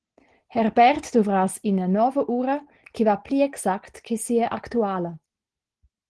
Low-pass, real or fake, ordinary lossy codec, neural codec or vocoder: 10.8 kHz; real; Opus, 16 kbps; none